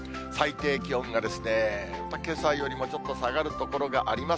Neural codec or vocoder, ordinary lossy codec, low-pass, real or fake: none; none; none; real